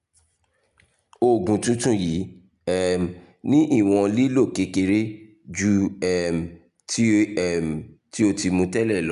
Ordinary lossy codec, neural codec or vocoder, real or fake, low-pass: none; none; real; 10.8 kHz